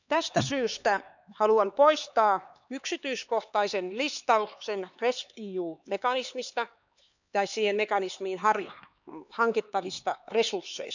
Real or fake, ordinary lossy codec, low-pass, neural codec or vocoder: fake; none; 7.2 kHz; codec, 16 kHz, 4 kbps, X-Codec, HuBERT features, trained on LibriSpeech